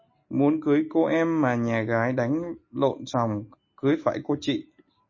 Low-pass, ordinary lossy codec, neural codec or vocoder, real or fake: 7.2 kHz; MP3, 32 kbps; none; real